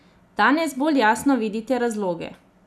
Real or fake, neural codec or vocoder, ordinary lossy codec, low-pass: real; none; none; none